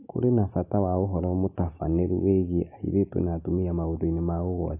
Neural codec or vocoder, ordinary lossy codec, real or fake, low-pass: none; AAC, 32 kbps; real; 3.6 kHz